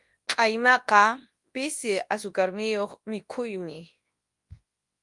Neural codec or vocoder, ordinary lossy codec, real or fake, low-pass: codec, 24 kHz, 0.9 kbps, WavTokenizer, large speech release; Opus, 32 kbps; fake; 10.8 kHz